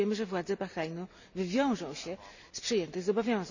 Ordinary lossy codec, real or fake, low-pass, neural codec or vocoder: none; real; 7.2 kHz; none